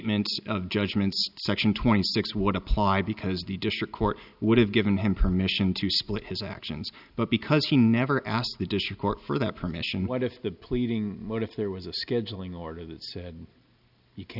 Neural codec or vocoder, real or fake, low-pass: none; real; 5.4 kHz